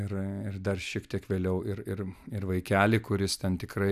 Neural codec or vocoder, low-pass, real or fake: none; 14.4 kHz; real